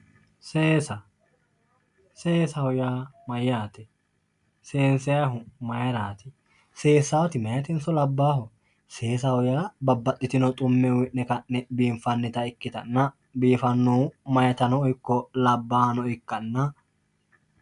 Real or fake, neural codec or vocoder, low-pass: real; none; 10.8 kHz